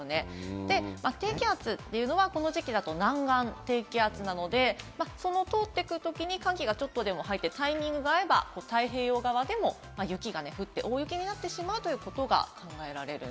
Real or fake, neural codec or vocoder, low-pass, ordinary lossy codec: real; none; none; none